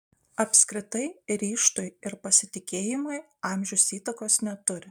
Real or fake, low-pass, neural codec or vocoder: real; 19.8 kHz; none